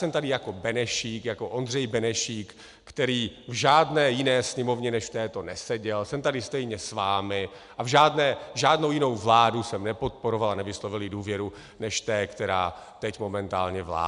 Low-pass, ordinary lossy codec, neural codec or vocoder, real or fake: 10.8 kHz; MP3, 96 kbps; none; real